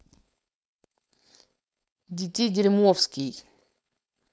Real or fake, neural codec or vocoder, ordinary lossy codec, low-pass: fake; codec, 16 kHz, 4.8 kbps, FACodec; none; none